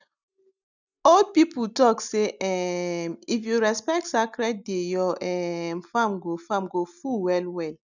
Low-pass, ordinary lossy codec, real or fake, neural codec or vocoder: 7.2 kHz; none; real; none